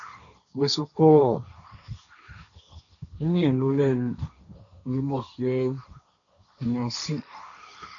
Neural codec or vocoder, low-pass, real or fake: codec, 16 kHz, 1.1 kbps, Voila-Tokenizer; 7.2 kHz; fake